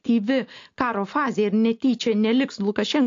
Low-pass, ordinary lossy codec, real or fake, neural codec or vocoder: 7.2 kHz; AAC, 48 kbps; real; none